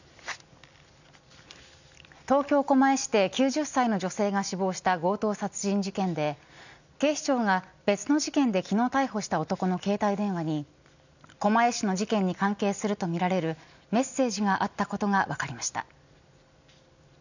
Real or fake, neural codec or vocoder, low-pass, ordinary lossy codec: real; none; 7.2 kHz; none